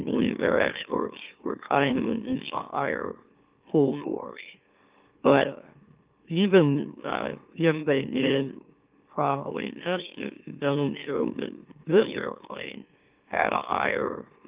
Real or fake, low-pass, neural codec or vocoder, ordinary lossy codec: fake; 3.6 kHz; autoencoder, 44.1 kHz, a latent of 192 numbers a frame, MeloTTS; Opus, 32 kbps